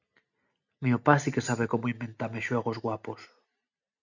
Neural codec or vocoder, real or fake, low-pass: none; real; 7.2 kHz